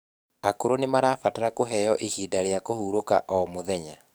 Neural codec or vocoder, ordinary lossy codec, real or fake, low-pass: codec, 44.1 kHz, 7.8 kbps, DAC; none; fake; none